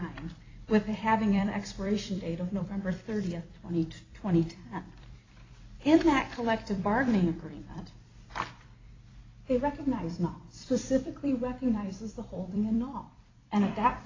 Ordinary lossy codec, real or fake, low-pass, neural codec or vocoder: AAC, 32 kbps; fake; 7.2 kHz; vocoder, 44.1 kHz, 128 mel bands every 256 samples, BigVGAN v2